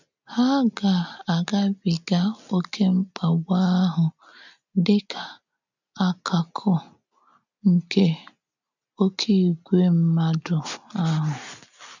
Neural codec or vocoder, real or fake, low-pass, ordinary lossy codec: none; real; 7.2 kHz; none